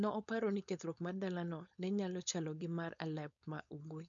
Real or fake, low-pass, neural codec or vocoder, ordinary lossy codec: fake; 7.2 kHz; codec, 16 kHz, 4.8 kbps, FACodec; none